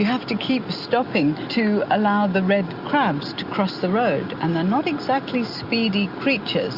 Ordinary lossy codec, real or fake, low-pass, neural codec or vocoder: Opus, 64 kbps; real; 5.4 kHz; none